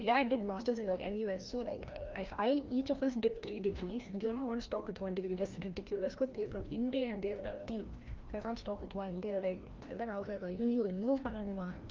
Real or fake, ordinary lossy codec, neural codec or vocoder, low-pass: fake; Opus, 24 kbps; codec, 16 kHz, 1 kbps, FreqCodec, larger model; 7.2 kHz